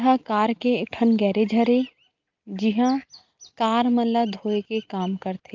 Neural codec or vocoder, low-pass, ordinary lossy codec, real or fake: none; 7.2 kHz; Opus, 32 kbps; real